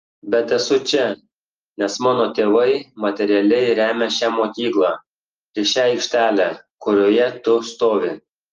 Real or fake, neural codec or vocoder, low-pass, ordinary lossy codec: real; none; 7.2 kHz; Opus, 24 kbps